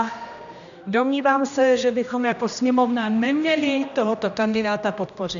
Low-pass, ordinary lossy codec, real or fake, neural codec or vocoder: 7.2 kHz; MP3, 96 kbps; fake; codec, 16 kHz, 1 kbps, X-Codec, HuBERT features, trained on general audio